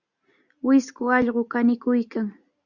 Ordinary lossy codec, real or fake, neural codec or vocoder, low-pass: Opus, 64 kbps; real; none; 7.2 kHz